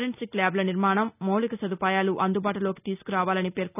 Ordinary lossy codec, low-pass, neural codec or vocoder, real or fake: none; 3.6 kHz; none; real